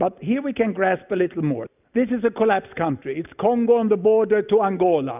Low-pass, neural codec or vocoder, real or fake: 3.6 kHz; none; real